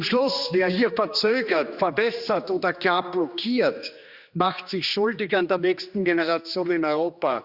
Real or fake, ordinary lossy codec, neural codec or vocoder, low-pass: fake; Opus, 64 kbps; codec, 16 kHz, 2 kbps, X-Codec, HuBERT features, trained on general audio; 5.4 kHz